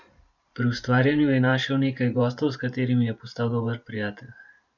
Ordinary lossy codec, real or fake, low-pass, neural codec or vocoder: none; fake; 7.2 kHz; vocoder, 44.1 kHz, 128 mel bands every 512 samples, BigVGAN v2